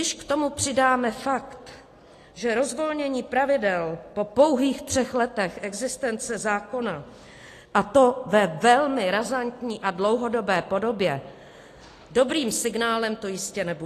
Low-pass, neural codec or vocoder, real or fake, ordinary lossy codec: 14.4 kHz; none; real; AAC, 48 kbps